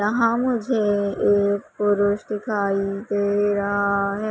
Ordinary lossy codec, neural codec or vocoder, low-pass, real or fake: none; none; none; real